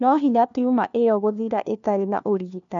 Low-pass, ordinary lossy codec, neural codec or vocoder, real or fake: 7.2 kHz; none; codec, 16 kHz, 1 kbps, FunCodec, trained on LibriTTS, 50 frames a second; fake